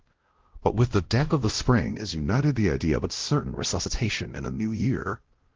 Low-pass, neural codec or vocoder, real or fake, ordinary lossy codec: 7.2 kHz; codec, 16 kHz, 0.5 kbps, X-Codec, WavLM features, trained on Multilingual LibriSpeech; fake; Opus, 16 kbps